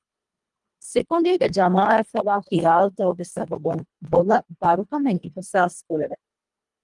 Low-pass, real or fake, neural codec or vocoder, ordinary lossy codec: 10.8 kHz; fake; codec, 24 kHz, 1.5 kbps, HILCodec; Opus, 32 kbps